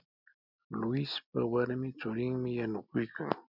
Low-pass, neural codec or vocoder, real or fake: 5.4 kHz; none; real